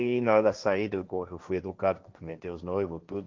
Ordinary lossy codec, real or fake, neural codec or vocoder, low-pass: Opus, 32 kbps; fake; codec, 16 kHz, 1.1 kbps, Voila-Tokenizer; 7.2 kHz